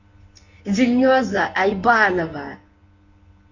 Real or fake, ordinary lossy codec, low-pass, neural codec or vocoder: fake; none; 7.2 kHz; codec, 24 kHz, 0.9 kbps, WavTokenizer, medium speech release version 1